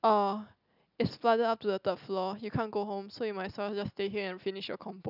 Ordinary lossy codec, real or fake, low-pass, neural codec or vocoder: none; real; 5.4 kHz; none